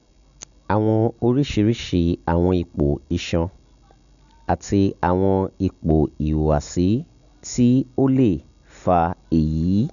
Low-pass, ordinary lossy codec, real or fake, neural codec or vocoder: 7.2 kHz; none; real; none